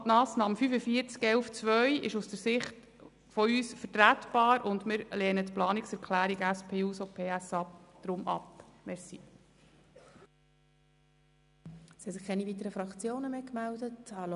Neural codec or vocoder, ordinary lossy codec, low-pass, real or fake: none; none; 10.8 kHz; real